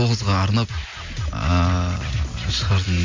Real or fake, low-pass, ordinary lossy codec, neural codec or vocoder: real; 7.2 kHz; MP3, 64 kbps; none